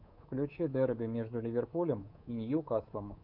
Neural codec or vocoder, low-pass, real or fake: codec, 24 kHz, 3.1 kbps, DualCodec; 5.4 kHz; fake